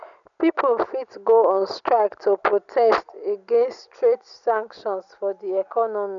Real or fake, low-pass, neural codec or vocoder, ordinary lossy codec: real; 7.2 kHz; none; none